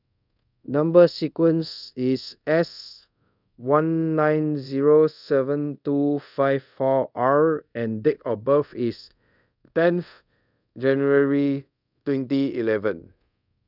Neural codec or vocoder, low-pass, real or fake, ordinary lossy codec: codec, 24 kHz, 0.5 kbps, DualCodec; 5.4 kHz; fake; none